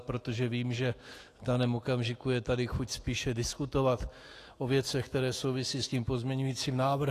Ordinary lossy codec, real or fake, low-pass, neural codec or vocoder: AAC, 48 kbps; fake; 14.4 kHz; autoencoder, 48 kHz, 128 numbers a frame, DAC-VAE, trained on Japanese speech